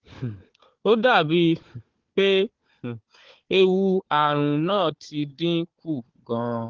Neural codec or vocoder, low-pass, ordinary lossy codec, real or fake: codec, 16 kHz, 4 kbps, FunCodec, trained on Chinese and English, 50 frames a second; 7.2 kHz; Opus, 16 kbps; fake